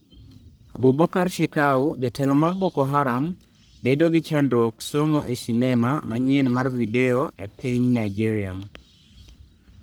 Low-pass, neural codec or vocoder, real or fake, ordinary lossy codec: none; codec, 44.1 kHz, 1.7 kbps, Pupu-Codec; fake; none